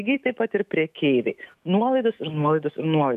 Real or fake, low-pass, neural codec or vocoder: fake; 14.4 kHz; autoencoder, 48 kHz, 128 numbers a frame, DAC-VAE, trained on Japanese speech